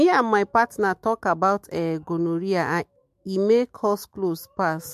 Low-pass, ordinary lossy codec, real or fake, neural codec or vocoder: 14.4 kHz; MP3, 64 kbps; fake; autoencoder, 48 kHz, 128 numbers a frame, DAC-VAE, trained on Japanese speech